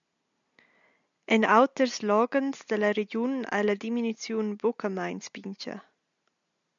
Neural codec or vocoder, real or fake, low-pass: none; real; 7.2 kHz